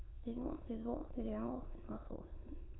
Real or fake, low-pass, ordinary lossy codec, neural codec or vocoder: fake; 7.2 kHz; AAC, 16 kbps; autoencoder, 22.05 kHz, a latent of 192 numbers a frame, VITS, trained on many speakers